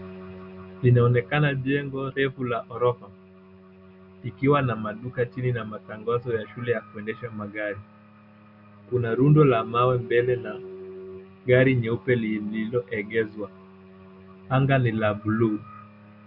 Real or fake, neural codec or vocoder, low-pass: real; none; 5.4 kHz